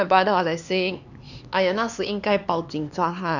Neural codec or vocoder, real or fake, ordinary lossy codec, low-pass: codec, 16 kHz, 4 kbps, X-Codec, HuBERT features, trained on LibriSpeech; fake; none; 7.2 kHz